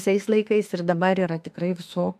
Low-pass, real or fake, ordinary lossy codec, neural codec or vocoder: 14.4 kHz; fake; AAC, 96 kbps; autoencoder, 48 kHz, 32 numbers a frame, DAC-VAE, trained on Japanese speech